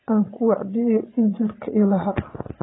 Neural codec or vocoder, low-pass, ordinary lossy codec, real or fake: vocoder, 22.05 kHz, 80 mel bands, Vocos; 7.2 kHz; AAC, 16 kbps; fake